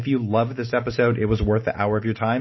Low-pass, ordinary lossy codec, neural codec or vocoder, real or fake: 7.2 kHz; MP3, 24 kbps; none; real